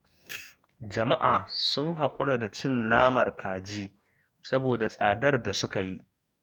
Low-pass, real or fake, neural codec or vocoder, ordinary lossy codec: 19.8 kHz; fake; codec, 44.1 kHz, 2.6 kbps, DAC; none